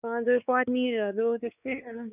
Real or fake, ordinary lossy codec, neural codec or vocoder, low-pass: fake; none; codec, 16 kHz, 4 kbps, X-Codec, WavLM features, trained on Multilingual LibriSpeech; 3.6 kHz